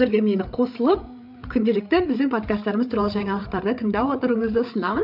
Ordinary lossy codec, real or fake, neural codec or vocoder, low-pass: none; fake; codec, 16 kHz, 8 kbps, FreqCodec, larger model; 5.4 kHz